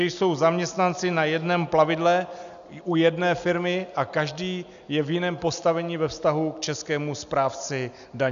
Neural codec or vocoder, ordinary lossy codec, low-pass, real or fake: none; AAC, 96 kbps; 7.2 kHz; real